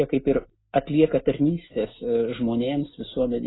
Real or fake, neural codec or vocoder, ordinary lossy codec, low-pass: real; none; AAC, 16 kbps; 7.2 kHz